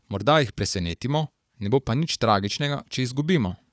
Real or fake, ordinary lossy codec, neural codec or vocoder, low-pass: fake; none; codec, 16 kHz, 4 kbps, FunCodec, trained on Chinese and English, 50 frames a second; none